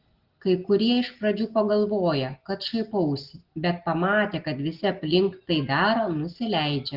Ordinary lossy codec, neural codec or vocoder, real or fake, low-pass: Opus, 16 kbps; none; real; 5.4 kHz